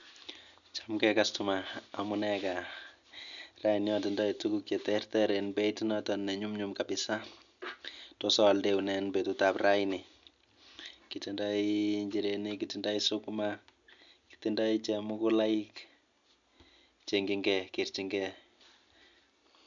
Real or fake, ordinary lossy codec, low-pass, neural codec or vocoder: real; none; 7.2 kHz; none